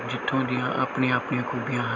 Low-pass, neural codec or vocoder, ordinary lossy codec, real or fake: 7.2 kHz; none; none; real